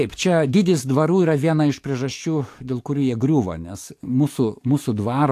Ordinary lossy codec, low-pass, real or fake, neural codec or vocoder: AAC, 64 kbps; 14.4 kHz; fake; codec, 44.1 kHz, 7.8 kbps, DAC